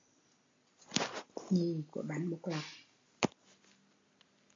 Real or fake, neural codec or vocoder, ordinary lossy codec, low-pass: real; none; AAC, 32 kbps; 7.2 kHz